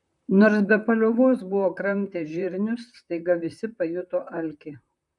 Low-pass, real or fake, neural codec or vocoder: 10.8 kHz; fake; vocoder, 44.1 kHz, 128 mel bands, Pupu-Vocoder